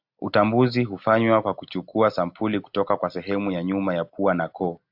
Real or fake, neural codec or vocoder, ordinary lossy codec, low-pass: real; none; AAC, 48 kbps; 5.4 kHz